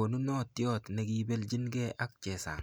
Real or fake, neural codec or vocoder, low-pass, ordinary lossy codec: real; none; none; none